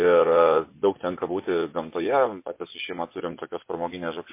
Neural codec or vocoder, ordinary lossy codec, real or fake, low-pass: vocoder, 24 kHz, 100 mel bands, Vocos; MP3, 24 kbps; fake; 3.6 kHz